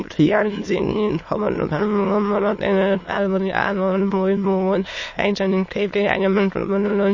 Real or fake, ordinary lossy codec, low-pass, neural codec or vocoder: fake; MP3, 32 kbps; 7.2 kHz; autoencoder, 22.05 kHz, a latent of 192 numbers a frame, VITS, trained on many speakers